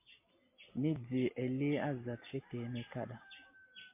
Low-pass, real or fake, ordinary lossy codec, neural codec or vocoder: 3.6 kHz; real; MP3, 24 kbps; none